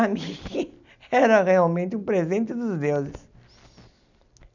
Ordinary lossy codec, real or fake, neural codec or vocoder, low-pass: none; real; none; 7.2 kHz